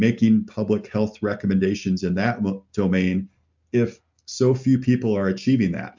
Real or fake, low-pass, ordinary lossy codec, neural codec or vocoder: real; 7.2 kHz; MP3, 64 kbps; none